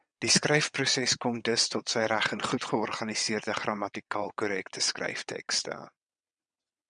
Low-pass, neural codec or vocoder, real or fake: 9.9 kHz; vocoder, 22.05 kHz, 80 mel bands, WaveNeXt; fake